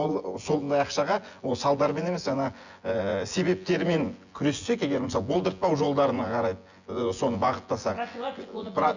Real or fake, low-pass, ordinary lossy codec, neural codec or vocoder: fake; 7.2 kHz; Opus, 64 kbps; vocoder, 24 kHz, 100 mel bands, Vocos